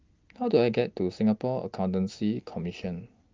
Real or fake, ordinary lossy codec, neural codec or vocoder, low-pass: real; Opus, 32 kbps; none; 7.2 kHz